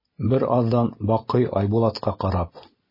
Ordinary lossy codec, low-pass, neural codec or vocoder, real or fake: MP3, 24 kbps; 5.4 kHz; none; real